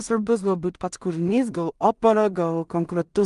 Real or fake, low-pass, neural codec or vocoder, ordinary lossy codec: fake; 10.8 kHz; codec, 16 kHz in and 24 kHz out, 0.4 kbps, LongCat-Audio-Codec, two codebook decoder; Opus, 32 kbps